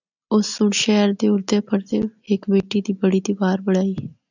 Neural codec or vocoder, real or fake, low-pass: none; real; 7.2 kHz